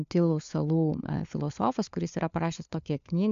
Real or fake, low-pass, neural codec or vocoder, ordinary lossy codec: fake; 7.2 kHz; codec, 16 kHz, 4 kbps, FunCodec, trained on LibriTTS, 50 frames a second; AAC, 96 kbps